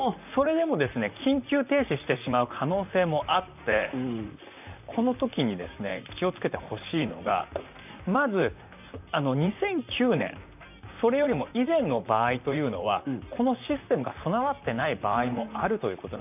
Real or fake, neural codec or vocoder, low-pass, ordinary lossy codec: fake; vocoder, 44.1 kHz, 128 mel bands, Pupu-Vocoder; 3.6 kHz; none